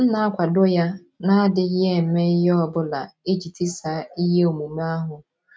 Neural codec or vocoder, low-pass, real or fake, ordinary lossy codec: none; none; real; none